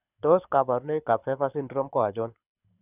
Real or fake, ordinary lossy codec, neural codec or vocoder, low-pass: fake; none; codec, 24 kHz, 6 kbps, HILCodec; 3.6 kHz